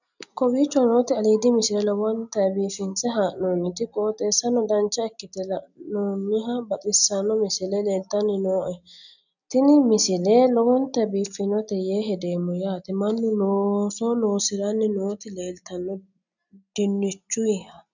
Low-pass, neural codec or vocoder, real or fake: 7.2 kHz; none; real